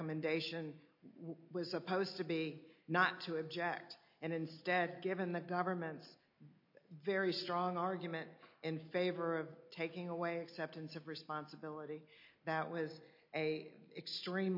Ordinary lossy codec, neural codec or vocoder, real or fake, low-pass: MP3, 32 kbps; none; real; 5.4 kHz